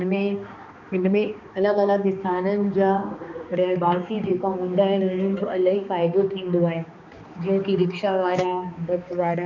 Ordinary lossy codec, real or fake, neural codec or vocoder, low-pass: none; fake; codec, 16 kHz, 2 kbps, X-Codec, HuBERT features, trained on balanced general audio; 7.2 kHz